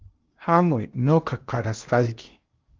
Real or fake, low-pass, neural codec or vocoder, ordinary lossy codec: fake; 7.2 kHz; codec, 16 kHz in and 24 kHz out, 0.8 kbps, FocalCodec, streaming, 65536 codes; Opus, 32 kbps